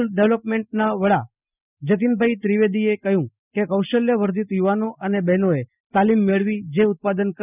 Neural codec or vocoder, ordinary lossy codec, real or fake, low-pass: none; none; real; 3.6 kHz